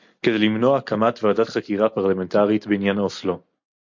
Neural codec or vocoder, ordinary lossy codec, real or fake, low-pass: none; MP3, 48 kbps; real; 7.2 kHz